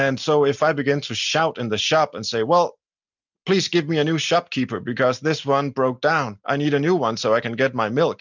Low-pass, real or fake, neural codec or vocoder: 7.2 kHz; real; none